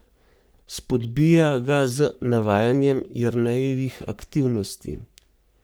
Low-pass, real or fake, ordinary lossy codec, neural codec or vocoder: none; fake; none; codec, 44.1 kHz, 3.4 kbps, Pupu-Codec